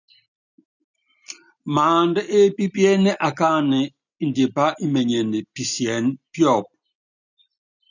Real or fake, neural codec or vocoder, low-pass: real; none; 7.2 kHz